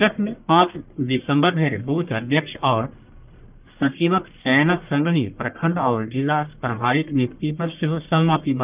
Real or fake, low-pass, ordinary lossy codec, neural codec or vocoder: fake; 3.6 kHz; Opus, 24 kbps; codec, 44.1 kHz, 1.7 kbps, Pupu-Codec